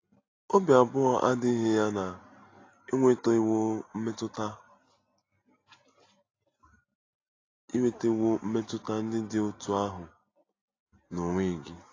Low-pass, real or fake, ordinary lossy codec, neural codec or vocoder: 7.2 kHz; real; AAC, 32 kbps; none